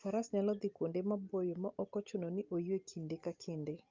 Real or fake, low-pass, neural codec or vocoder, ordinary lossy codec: real; 7.2 kHz; none; Opus, 24 kbps